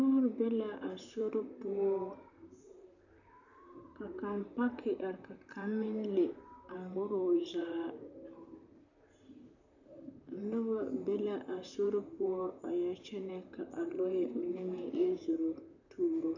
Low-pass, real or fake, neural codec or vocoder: 7.2 kHz; fake; vocoder, 44.1 kHz, 128 mel bands, Pupu-Vocoder